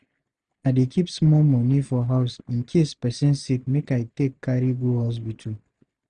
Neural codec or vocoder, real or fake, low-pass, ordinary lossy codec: none; real; 9.9 kHz; none